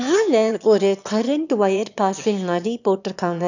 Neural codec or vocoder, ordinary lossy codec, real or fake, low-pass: autoencoder, 22.05 kHz, a latent of 192 numbers a frame, VITS, trained on one speaker; none; fake; 7.2 kHz